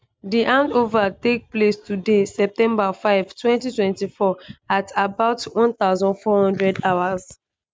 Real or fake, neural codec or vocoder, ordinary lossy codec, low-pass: real; none; none; none